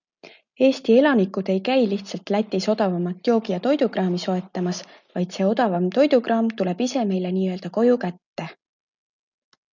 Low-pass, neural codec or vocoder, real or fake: 7.2 kHz; none; real